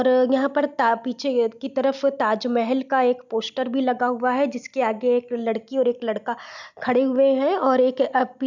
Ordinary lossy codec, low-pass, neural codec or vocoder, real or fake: none; 7.2 kHz; none; real